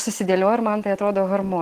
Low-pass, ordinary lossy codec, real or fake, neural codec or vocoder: 14.4 kHz; Opus, 16 kbps; real; none